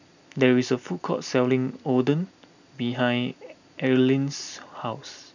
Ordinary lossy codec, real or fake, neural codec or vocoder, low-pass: none; real; none; 7.2 kHz